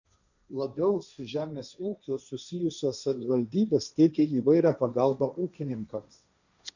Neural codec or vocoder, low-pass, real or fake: codec, 16 kHz, 1.1 kbps, Voila-Tokenizer; 7.2 kHz; fake